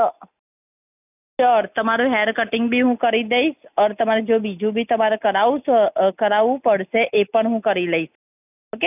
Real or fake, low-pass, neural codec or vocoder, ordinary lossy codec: real; 3.6 kHz; none; none